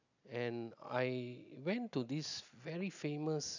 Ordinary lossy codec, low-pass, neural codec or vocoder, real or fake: none; 7.2 kHz; none; real